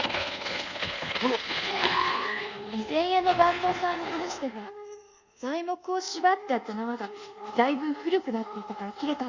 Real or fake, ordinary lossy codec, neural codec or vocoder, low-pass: fake; Opus, 64 kbps; codec, 24 kHz, 1.2 kbps, DualCodec; 7.2 kHz